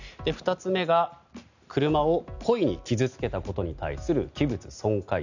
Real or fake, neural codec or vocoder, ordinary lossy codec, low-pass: real; none; none; 7.2 kHz